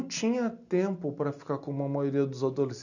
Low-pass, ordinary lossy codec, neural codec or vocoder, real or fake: 7.2 kHz; none; none; real